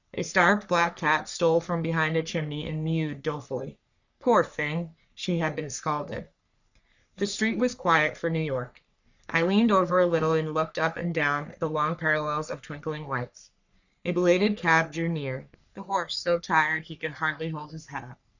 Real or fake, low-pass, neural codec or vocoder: fake; 7.2 kHz; codec, 44.1 kHz, 3.4 kbps, Pupu-Codec